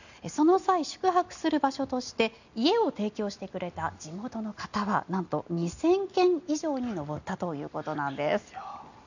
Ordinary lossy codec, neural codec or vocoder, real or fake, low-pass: none; none; real; 7.2 kHz